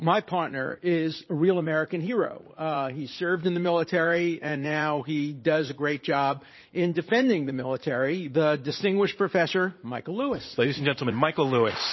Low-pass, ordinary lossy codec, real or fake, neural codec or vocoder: 7.2 kHz; MP3, 24 kbps; fake; vocoder, 44.1 kHz, 80 mel bands, Vocos